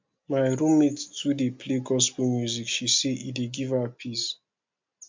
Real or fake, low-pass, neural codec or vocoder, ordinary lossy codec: real; 7.2 kHz; none; MP3, 64 kbps